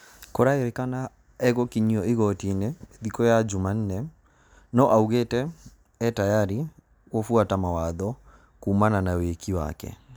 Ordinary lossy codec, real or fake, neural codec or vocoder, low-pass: none; real; none; none